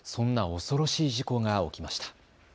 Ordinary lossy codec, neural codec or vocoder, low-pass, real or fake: none; none; none; real